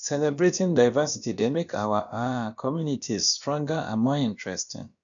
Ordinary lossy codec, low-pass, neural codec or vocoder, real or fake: none; 7.2 kHz; codec, 16 kHz, about 1 kbps, DyCAST, with the encoder's durations; fake